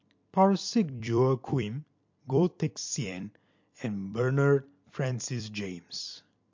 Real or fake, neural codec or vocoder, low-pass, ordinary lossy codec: fake; vocoder, 44.1 kHz, 128 mel bands every 256 samples, BigVGAN v2; 7.2 kHz; MP3, 48 kbps